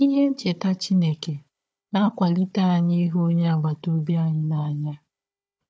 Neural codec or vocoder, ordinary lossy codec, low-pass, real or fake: codec, 16 kHz, 4 kbps, FunCodec, trained on Chinese and English, 50 frames a second; none; none; fake